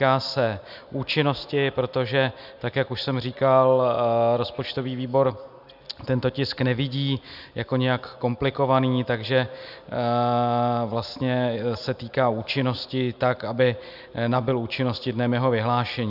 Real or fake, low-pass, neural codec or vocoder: real; 5.4 kHz; none